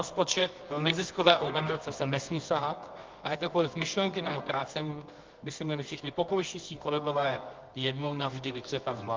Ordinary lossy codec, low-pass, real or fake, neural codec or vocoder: Opus, 32 kbps; 7.2 kHz; fake; codec, 24 kHz, 0.9 kbps, WavTokenizer, medium music audio release